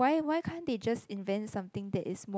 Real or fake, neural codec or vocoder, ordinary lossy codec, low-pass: real; none; none; none